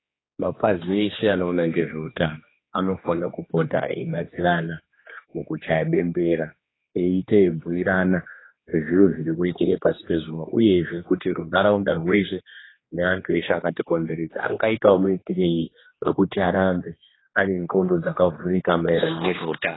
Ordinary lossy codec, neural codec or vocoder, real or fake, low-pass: AAC, 16 kbps; codec, 16 kHz, 2 kbps, X-Codec, HuBERT features, trained on general audio; fake; 7.2 kHz